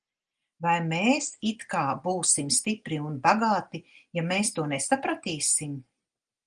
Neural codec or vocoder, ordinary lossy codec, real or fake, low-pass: none; Opus, 24 kbps; real; 9.9 kHz